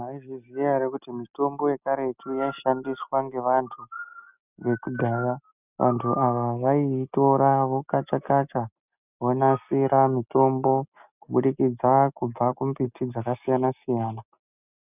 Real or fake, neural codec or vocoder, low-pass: real; none; 3.6 kHz